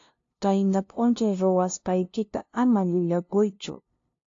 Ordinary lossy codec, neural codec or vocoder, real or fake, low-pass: AAC, 48 kbps; codec, 16 kHz, 0.5 kbps, FunCodec, trained on LibriTTS, 25 frames a second; fake; 7.2 kHz